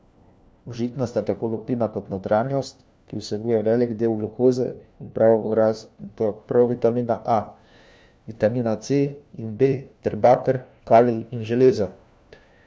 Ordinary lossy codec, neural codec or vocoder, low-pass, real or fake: none; codec, 16 kHz, 1 kbps, FunCodec, trained on LibriTTS, 50 frames a second; none; fake